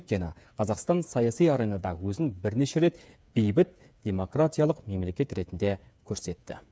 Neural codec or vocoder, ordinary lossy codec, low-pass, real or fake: codec, 16 kHz, 8 kbps, FreqCodec, smaller model; none; none; fake